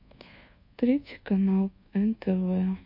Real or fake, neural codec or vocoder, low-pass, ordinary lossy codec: fake; codec, 24 kHz, 1.2 kbps, DualCodec; 5.4 kHz; MP3, 32 kbps